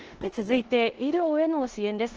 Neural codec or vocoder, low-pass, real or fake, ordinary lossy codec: codec, 16 kHz in and 24 kHz out, 0.9 kbps, LongCat-Audio-Codec, four codebook decoder; 7.2 kHz; fake; Opus, 16 kbps